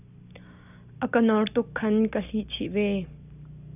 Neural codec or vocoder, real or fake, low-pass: none; real; 3.6 kHz